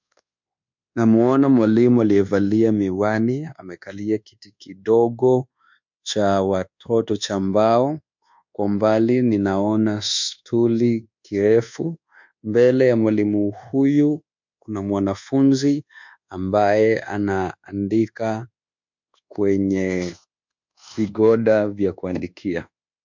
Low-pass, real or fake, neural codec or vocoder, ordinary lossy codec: 7.2 kHz; fake; codec, 24 kHz, 1.2 kbps, DualCodec; MP3, 64 kbps